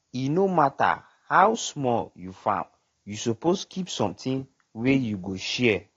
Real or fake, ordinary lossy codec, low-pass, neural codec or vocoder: real; AAC, 32 kbps; 7.2 kHz; none